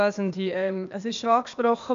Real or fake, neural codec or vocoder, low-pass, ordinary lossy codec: fake; codec, 16 kHz, 0.8 kbps, ZipCodec; 7.2 kHz; AAC, 64 kbps